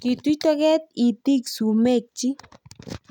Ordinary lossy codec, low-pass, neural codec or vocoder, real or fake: none; 19.8 kHz; none; real